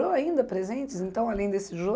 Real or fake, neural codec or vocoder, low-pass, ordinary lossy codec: real; none; none; none